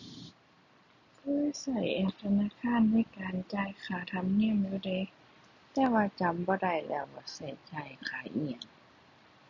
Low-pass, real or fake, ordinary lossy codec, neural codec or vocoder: 7.2 kHz; real; none; none